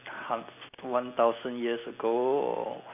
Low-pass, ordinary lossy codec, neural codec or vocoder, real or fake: 3.6 kHz; none; none; real